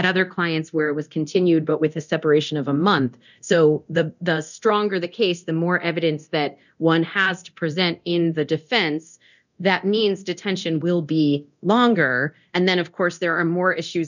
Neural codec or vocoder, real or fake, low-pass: codec, 24 kHz, 0.9 kbps, DualCodec; fake; 7.2 kHz